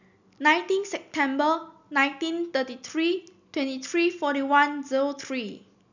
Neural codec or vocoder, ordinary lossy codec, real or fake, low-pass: none; none; real; 7.2 kHz